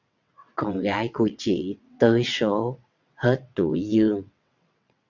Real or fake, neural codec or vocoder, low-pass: fake; vocoder, 22.05 kHz, 80 mel bands, WaveNeXt; 7.2 kHz